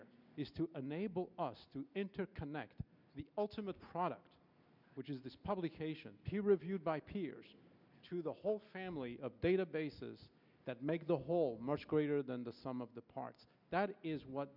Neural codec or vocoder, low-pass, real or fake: none; 5.4 kHz; real